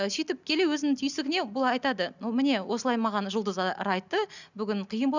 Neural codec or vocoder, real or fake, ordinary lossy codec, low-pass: none; real; none; 7.2 kHz